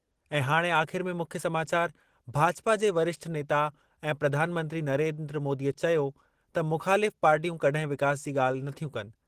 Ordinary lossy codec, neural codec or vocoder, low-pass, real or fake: Opus, 16 kbps; none; 14.4 kHz; real